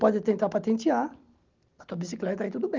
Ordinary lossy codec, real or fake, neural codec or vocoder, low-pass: Opus, 32 kbps; real; none; 7.2 kHz